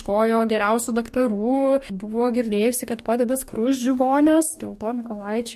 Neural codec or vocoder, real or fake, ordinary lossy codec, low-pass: codec, 44.1 kHz, 2.6 kbps, DAC; fake; MP3, 64 kbps; 14.4 kHz